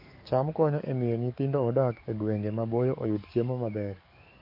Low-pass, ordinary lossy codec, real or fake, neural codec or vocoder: 5.4 kHz; MP3, 32 kbps; fake; codec, 44.1 kHz, 7.8 kbps, DAC